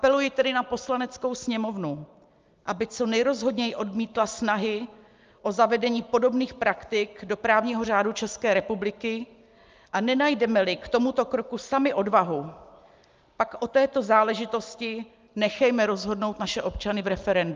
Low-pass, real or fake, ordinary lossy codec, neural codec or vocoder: 7.2 kHz; real; Opus, 24 kbps; none